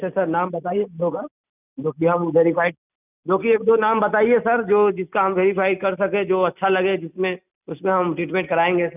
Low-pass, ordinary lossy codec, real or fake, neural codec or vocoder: 3.6 kHz; none; real; none